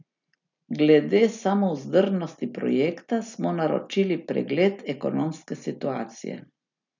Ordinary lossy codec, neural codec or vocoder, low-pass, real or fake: none; none; 7.2 kHz; real